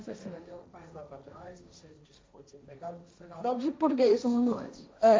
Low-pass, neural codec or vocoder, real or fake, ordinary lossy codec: none; codec, 16 kHz, 1.1 kbps, Voila-Tokenizer; fake; none